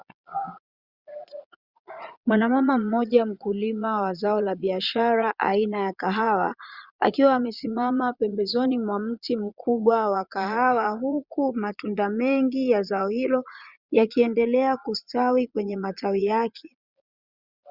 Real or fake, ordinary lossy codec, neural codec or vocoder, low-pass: fake; Opus, 64 kbps; vocoder, 22.05 kHz, 80 mel bands, Vocos; 5.4 kHz